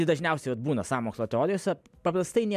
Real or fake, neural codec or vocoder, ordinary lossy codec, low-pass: real; none; MP3, 96 kbps; 14.4 kHz